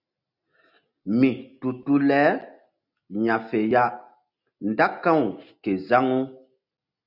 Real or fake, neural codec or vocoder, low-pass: real; none; 5.4 kHz